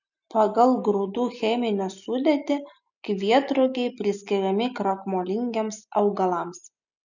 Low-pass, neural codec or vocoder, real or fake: 7.2 kHz; none; real